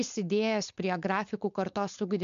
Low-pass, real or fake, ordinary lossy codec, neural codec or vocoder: 7.2 kHz; fake; MP3, 64 kbps; codec, 16 kHz, 4.8 kbps, FACodec